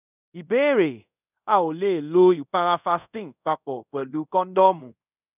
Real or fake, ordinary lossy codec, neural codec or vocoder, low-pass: fake; none; codec, 24 kHz, 0.5 kbps, DualCodec; 3.6 kHz